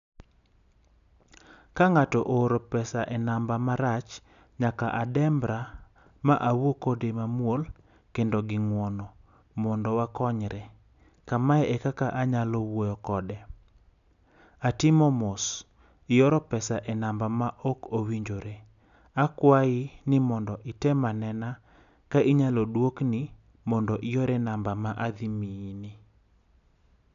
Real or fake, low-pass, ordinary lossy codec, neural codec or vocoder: real; 7.2 kHz; none; none